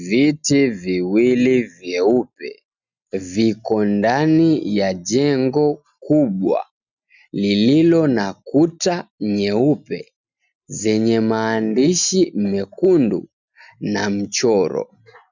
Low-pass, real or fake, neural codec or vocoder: 7.2 kHz; real; none